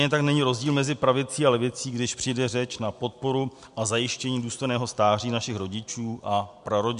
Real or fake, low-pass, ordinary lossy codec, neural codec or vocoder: real; 10.8 kHz; MP3, 64 kbps; none